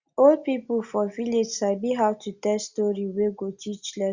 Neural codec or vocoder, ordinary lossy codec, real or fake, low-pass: none; Opus, 64 kbps; real; 7.2 kHz